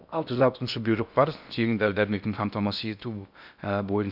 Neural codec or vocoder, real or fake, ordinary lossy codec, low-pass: codec, 16 kHz in and 24 kHz out, 0.6 kbps, FocalCodec, streaming, 2048 codes; fake; none; 5.4 kHz